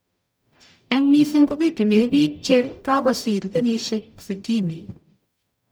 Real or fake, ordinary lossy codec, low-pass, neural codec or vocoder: fake; none; none; codec, 44.1 kHz, 0.9 kbps, DAC